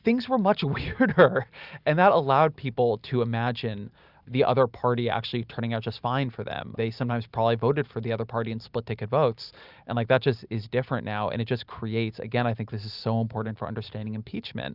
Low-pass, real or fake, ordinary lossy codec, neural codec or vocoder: 5.4 kHz; real; Opus, 64 kbps; none